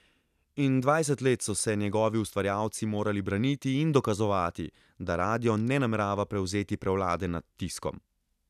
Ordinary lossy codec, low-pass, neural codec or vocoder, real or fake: none; 14.4 kHz; none; real